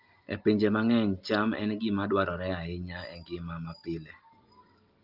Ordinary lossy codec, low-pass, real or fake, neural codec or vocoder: Opus, 32 kbps; 5.4 kHz; real; none